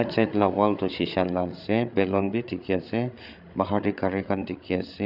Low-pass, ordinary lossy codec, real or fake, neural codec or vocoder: 5.4 kHz; none; fake; codec, 16 kHz, 8 kbps, FreqCodec, larger model